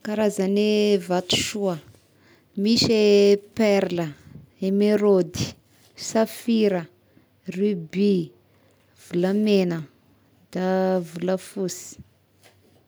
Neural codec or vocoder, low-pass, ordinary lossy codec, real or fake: none; none; none; real